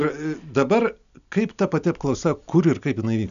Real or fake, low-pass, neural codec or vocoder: real; 7.2 kHz; none